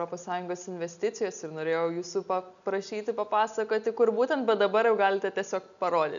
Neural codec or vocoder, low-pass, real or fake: none; 7.2 kHz; real